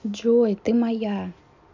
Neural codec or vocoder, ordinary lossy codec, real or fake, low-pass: none; none; real; 7.2 kHz